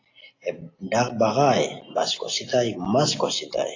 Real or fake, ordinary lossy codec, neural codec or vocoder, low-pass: real; AAC, 32 kbps; none; 7.2 kHz